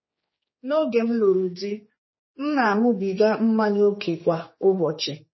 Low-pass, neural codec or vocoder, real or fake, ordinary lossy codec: 7.2 kHz; codec, 16 kHz, 4 kbps, X-Codec, HuBERT features, trained on general audio; fake; MP3, 24 kbps